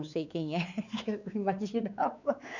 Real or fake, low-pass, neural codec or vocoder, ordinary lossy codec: fake; 7.2 kHz; codec, 16 kHz, 4 kbps, X-Codec, WavLM features, trained on Multilingual LibriSpeech; none